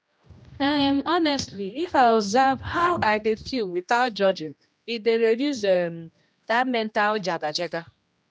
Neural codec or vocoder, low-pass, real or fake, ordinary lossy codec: codec, 16 kHz, 1 kbps, X-Codec, HuBERT features, trained on general audio; none; fake; none